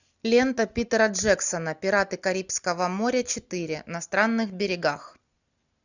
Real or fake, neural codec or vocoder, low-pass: real; none; 7.2 kHz